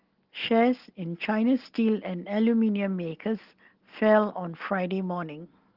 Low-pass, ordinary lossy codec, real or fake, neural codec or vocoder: 5.4 kHz; Opus, 16 kbps; real; none